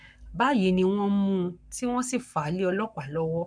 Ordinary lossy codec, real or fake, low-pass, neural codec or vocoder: none; fake; 9.9 kHz; codec, 44.1 kHz, 7.8 kbps, Pupu-Codec